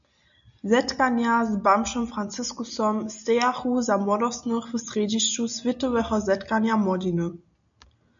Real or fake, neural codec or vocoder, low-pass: real; none; 7.2 kHz